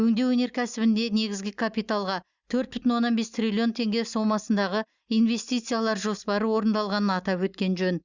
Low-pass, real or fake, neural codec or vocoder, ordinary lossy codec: 7.2 kHz; real; none; Opus, 64 kbps